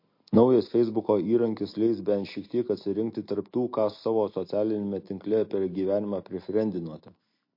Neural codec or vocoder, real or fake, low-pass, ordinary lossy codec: none; real; 5.4 kHz; MP3, 32 kbps